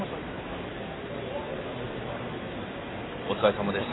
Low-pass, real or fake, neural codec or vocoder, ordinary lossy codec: 7.2 kHz; real; none; AAC, 16 kbps